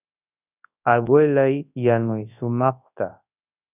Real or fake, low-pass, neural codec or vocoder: fake; 3.6 kHz; codec, 24 kHz, 0.9 kbps, WavTokenizer, large speech release